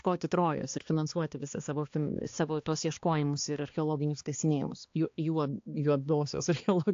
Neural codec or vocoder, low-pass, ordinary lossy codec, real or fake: codec, 16 kHz, 2 kbps, X-Codec, HuBERT features, trained on balanced general audio; 7.2 kHz; AAC, 48 kbps; fake